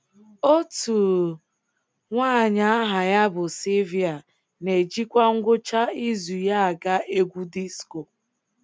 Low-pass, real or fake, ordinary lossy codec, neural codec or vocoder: none; real; none; none